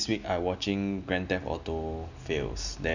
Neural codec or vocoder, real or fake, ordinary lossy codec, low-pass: none; real; none; 7.2 kHz